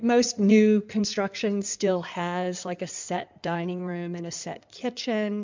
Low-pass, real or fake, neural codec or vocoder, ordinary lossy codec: 7.2 kHz; fake; codec, 16 kHz in and 24 kHz out, 2.2 kbps, FireRedTTS-2 codec; MP3, 64 kbps